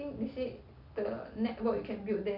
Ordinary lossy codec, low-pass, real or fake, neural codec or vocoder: none; 5.4 kHz; real; none